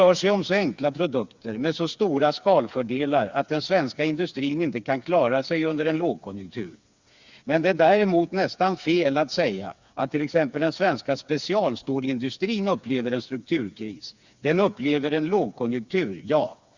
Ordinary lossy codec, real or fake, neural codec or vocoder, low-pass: Opus, 64 kbps; fake; codec, 16 kHz, 4 kbps, FreqCodec, smaller model; 7.2 kHz